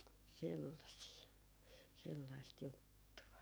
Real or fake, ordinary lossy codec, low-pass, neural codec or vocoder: fake; none; none; codec, 44.1 kHz, 7.8 kbps, DAC